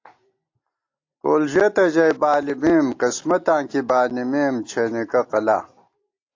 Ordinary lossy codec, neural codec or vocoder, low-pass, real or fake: AAC, 48 kbps; none; 7.2 kHz; real